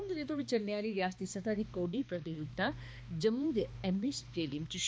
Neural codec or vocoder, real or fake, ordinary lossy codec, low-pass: codec, 16 kHz, 2 kbps, X-Codec, HuBERT features, trained on balanced general audio; fake; none; none